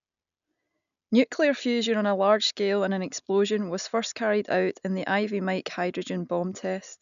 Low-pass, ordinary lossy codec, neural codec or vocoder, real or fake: 7.2 kHz; none; none; real